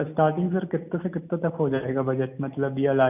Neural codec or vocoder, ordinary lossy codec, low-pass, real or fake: none; none; 3.6 kHz; real